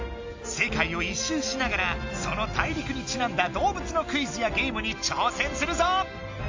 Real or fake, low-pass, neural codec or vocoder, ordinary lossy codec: real; 7.2 kHz; none; AAC, 48 kbps